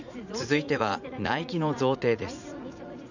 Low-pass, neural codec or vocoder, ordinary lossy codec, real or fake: 7.2 kHz; vocoder, 44.1 kHz, 80 mel bands, Vocos; none; fake